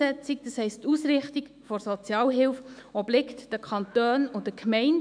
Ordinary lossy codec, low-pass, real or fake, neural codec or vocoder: none; 9.9 kHz; fake; autoencoder, 48 kHz, 128 numbers a frame, DAC-VAE, trained on Japanese speech